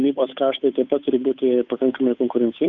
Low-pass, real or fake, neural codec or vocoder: 7.2 kHz; fake; codec, 16 kHz, 8 kbps, FunCodec, trained on Chinese and English, 25 frames a second